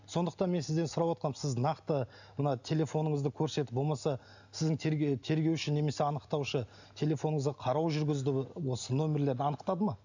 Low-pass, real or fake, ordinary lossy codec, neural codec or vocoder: 7.2 kHz; real; none; none